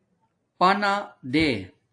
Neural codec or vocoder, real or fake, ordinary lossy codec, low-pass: none; real; AAC, 48 kbps; 9.9 kHz